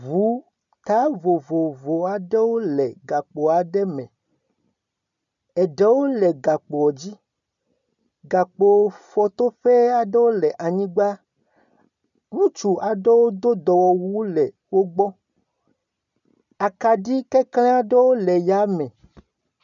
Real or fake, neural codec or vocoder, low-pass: real; none; 7.2 kHz